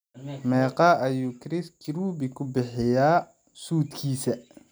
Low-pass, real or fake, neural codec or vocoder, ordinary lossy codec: none; real; none; none